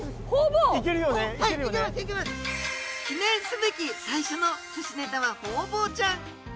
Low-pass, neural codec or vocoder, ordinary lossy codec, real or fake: none; none; none; real